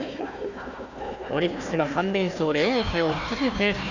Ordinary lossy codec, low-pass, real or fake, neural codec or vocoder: none; 7.2 kHz; fake; codec, 16 kHz, 1 kbps, FunCodec, trained on Chinese and English, 50 frames a second